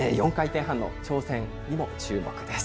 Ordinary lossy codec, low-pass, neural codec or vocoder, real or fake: none; none; none; real